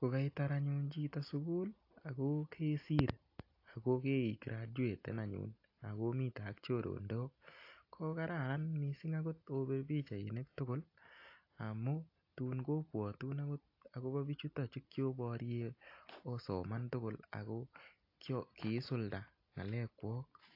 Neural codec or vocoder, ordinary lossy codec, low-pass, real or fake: none; AAC, 32 kbps; 5.4 kHz; real